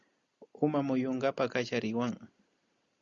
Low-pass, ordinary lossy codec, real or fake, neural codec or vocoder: 7.2 kHz; Opus, 64 kbps; real; none